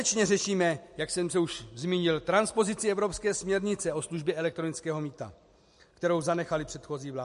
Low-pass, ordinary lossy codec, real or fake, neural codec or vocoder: 10.8 kHz; MP3, 48 kbps; real; none